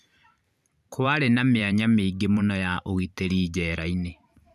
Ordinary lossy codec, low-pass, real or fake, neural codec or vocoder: none; 14.4 kHz; fake; vocoder, 44.1 kHz, 128 mel bands every 512 samples, BigVGAN v2